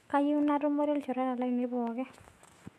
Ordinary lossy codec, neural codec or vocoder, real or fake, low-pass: MP3, 64 kbps; autoencoder, 48 kHz, 128 numbers a frame, DAC-VAE, trained on Japanese speech; fake; 14.4 kHz